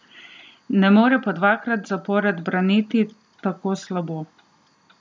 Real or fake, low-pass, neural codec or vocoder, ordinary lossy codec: real; none; none; none